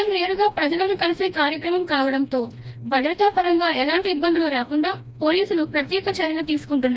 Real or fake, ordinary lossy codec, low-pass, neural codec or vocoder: fake; none; none; codec, 16 kHz, 1 kbps, FreqCodec, smaller model